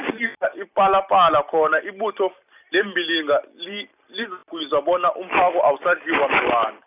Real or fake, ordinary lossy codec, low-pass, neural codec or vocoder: real; none; 3.6 kHz; none